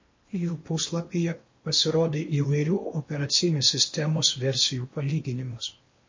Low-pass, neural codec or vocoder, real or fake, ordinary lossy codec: 7.2 kHz; codec, 16 kHz in and 24 kHz out, 0.8 kbps, FocalCodec, streaming, 65536 codes; fake; MP3, 32 kbps